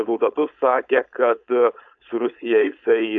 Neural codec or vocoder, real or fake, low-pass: codec, 16 kHz, 4.8 kbps, FACodec; fake; 7.2 kHz